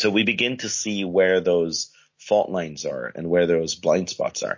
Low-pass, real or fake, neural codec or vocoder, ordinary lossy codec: 7.2 kHz; real; none; MP3, 32 kbps